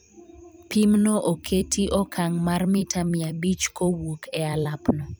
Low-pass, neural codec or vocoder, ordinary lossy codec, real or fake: none; vocoder, 44.1 kHz, 128 mel bands every 256 samples, BigVGAN v2; none; fake